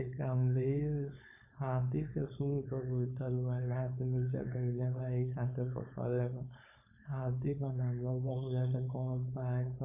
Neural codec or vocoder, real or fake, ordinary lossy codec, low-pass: codec, 16 kHz, 4.8 kbps, FACodec; fake; MP3, 24 kbps; 3.6 kHz